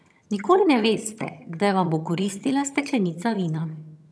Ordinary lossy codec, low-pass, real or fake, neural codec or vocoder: none; none; fake; vocoder, 22.05 kHz, 80 mel bands, HiFi-GAN